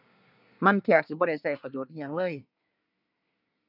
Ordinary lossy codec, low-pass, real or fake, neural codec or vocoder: none; 5.4 kHz; fake; codec, 24 kHz, 1 kbps, SNAC